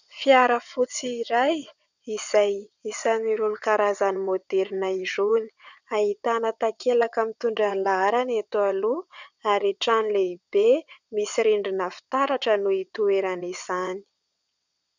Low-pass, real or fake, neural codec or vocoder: 7.2 kHz; fake; vocoder, 22.05 kHz, 80 mel bands, WaveNeXt